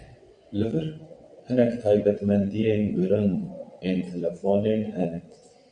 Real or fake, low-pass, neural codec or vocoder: fake; 9.9 kHz; vocoder, 22.05 kHz, 80 mel bands, WaveNeXt